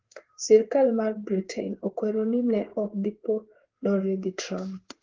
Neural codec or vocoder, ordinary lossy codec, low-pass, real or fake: codec, 16 kHz in and 24 kHz out, 1 kbps, XY-Tokenizer; Opus, 16 kbps; 7.2 kHz; fake